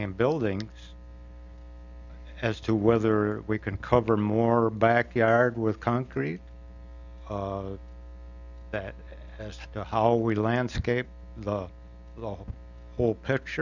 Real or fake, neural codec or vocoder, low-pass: real; none; 7.2 kHz